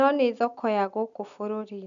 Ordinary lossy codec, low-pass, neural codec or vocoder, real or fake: none; 7.2 kHz; none; real